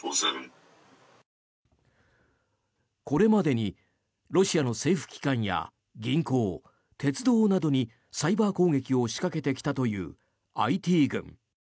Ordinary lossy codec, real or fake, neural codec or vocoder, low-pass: none; real; none; none